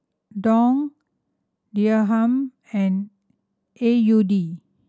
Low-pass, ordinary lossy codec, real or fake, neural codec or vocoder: none; none; real; none